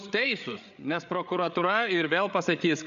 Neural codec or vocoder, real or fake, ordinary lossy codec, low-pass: codec, 16 kHz, 8 kbps, FreqCodec, larger model; fake; AAC, 96 kbps; 7.2 kHz